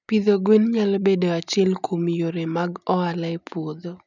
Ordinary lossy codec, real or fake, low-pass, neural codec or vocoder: none; real; 7.2 kHz; none